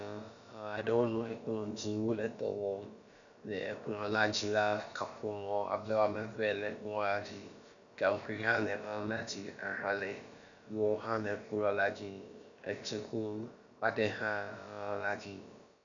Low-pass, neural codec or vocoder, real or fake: 7.2 kHz; codec, 16 kHz, about 1 kbps, DyCAST, with the encoder's durations; fake